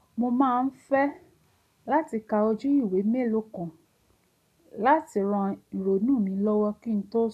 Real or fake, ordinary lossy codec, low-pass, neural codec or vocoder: real; none; 14.4 kHz; none